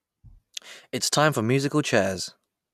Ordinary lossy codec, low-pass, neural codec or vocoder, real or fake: none; 14.4 kHz; none; real